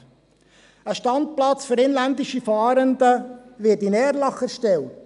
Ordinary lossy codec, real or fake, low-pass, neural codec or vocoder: none; real; 10.8 kHz; none